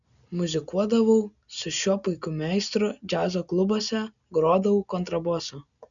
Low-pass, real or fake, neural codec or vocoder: 7.2 kHz; real; none